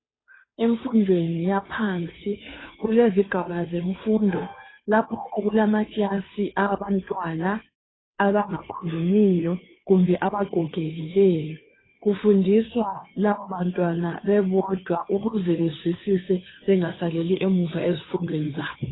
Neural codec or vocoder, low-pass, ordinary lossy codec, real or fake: codec, 16 kHz, 2 kbps, FunCodec, trained on Chinese and English, 25 frames a second; 7.2 kHz; AAC, 16 kbps; fake